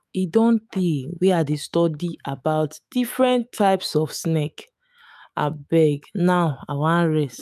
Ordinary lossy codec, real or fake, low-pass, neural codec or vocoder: none; fake; 14.4 kHz; autoencoder, 48 kHz, 128 numbers a frame, DAC-VAE, trained on Japanese speech